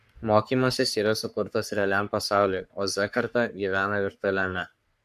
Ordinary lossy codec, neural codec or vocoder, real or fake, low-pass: AAC, 96 kbps; codec, 44.1 kHz, 3.4 kbps, Pupu-Codec; fake; 14.4 kHz